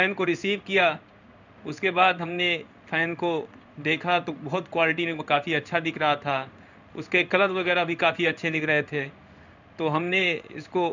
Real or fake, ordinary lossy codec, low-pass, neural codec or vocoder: fake; none; 7.2 kHz; codec, 16 kHz in and 24 kHz out, 1 kbps, XY-Tokenizer